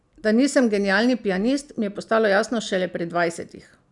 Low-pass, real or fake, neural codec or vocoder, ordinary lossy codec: 10.8 kHz; real; none; none